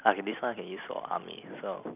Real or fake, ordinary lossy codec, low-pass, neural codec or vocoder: real; none; 3.6 kHz; none